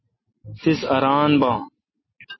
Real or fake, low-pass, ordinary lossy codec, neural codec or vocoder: real; 7.2 kHz; MP3, 24 kbps; none